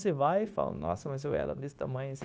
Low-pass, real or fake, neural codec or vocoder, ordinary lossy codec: none; fake; codec, 16 kHz, 0.9 kbps, LongCat-Audio-Codec; none